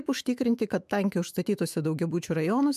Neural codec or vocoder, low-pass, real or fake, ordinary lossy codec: autoencoder, 48 kHz, 128 numbers a frame, DAC-VAE, trained on Japanese speech; 14.4 kHz; fake; MP3, 96 kbps